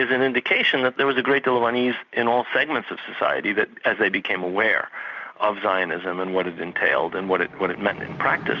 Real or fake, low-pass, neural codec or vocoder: real; 7.2 kHz; none